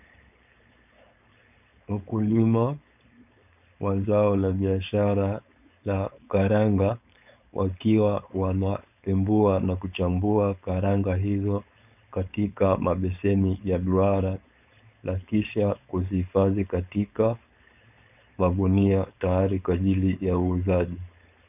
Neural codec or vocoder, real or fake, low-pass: codec, 16 kHz, 4.8 kbps, FACodec; fake; 3.6 kHz